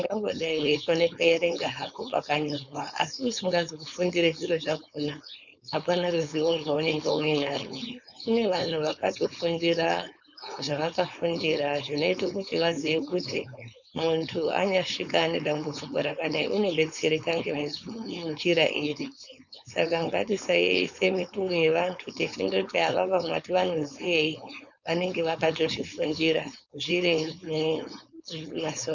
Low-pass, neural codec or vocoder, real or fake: 7.2 kHz; codec, 16 kHz, 4.8 kbps, FACodec; fake